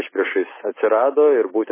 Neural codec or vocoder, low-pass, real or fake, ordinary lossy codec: none; 3.6 kHz; real; MP3, 16 kbps